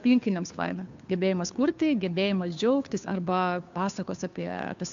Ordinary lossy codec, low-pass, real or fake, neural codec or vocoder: AAC, 64 kbps; 7.2 kHz; fake; codec, 16 kHz, 2 kbps, FunCodec, trained on Chinese and English, 25 frames a second